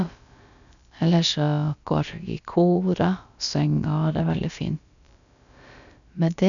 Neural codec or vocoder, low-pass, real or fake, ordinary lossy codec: codec, 16 kHz, about 1 kbps, DyCAST, with the encoder's durations; 7.2 kHz; fake; none